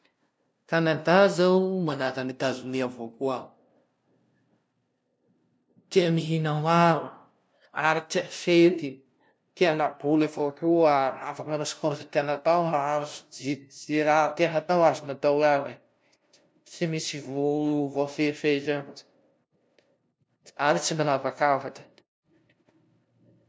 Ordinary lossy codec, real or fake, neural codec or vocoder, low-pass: none; fake; codec, 16 kHz, 0.5 kbps, FunCodec, trained on LibriTTS, 25 frames a second; none